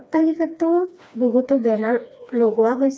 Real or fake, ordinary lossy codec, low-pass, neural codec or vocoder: fake; none; none; codec, 16 kHz, 2 kbps, FreqCodec, smaller model